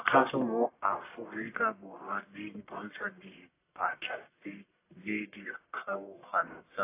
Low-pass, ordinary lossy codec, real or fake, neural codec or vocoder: 3.6 kHz; AAC, 24 kbps; fake; codec, 44.1 kHz, 1.7 kbps, Pupu-Codec